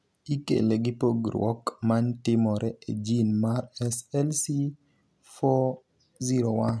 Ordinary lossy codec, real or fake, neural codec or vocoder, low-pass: none; real; none; none